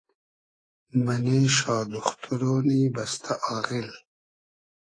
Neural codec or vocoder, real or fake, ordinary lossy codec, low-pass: codec, 24 kHz, 3.1 kbps, DualCodec; fake; AAC, 32 kbps; 9.9 kHz